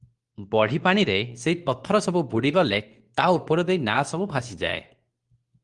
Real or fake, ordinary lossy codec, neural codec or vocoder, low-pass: fake; Opus, 24 kbps; codec, 24 kHz, 0.9 kbps, WavTokenizer, medium speech release version 2; 10.8 kHz